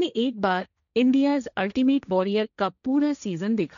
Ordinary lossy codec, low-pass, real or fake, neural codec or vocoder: none; 7.2 kHz; fake; codec, 16 kHz, 1.1 kbps, Voila-Tokenizer